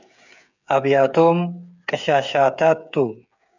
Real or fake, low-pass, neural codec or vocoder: fake; 7.2 kHz; codec, 16 kHz, 8 kbps, FreqCodec, smaller model